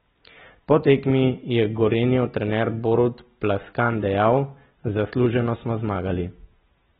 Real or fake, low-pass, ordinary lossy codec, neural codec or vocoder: real; 7.2 kHz; AAC, 16 kbps; none